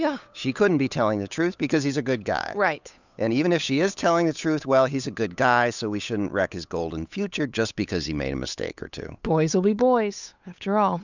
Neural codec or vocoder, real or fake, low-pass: codec, 16 kHz, 8 kbps, FunCodec, trained on Chinese and English, 25 frames a second; fake; 7.2 kHz